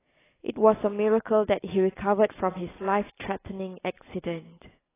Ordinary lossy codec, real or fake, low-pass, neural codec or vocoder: AAC, 16 kbps; real; 3.6 kHz; none